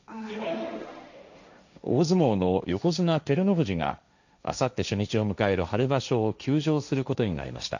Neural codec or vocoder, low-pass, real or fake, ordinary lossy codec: codec, 16 kHz, 1.1 kbps, Voila-Tokenizer; 7.2 kHz; fake; none